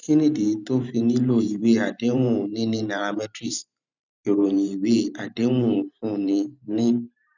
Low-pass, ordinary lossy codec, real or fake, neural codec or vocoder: 7.2 kHz; none; fake; vocoder, 44.1 kHz, 128 mel bands every 512 samples, BigVGAN v2